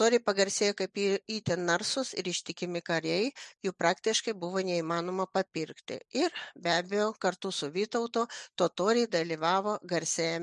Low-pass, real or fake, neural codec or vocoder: 10.8 kHz; real; none